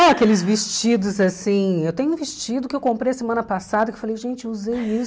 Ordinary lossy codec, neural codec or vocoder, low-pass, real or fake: none; none; none; real